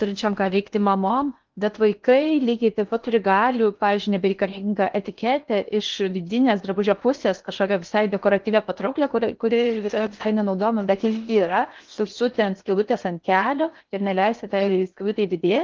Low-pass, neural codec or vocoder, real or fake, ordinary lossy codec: 7.2 kHz; codec, 16 kHz in and 24 kHz out, 0.8 kbps, FocalCodec, streaming, 65536 codes; fake; Opus, 32 kbps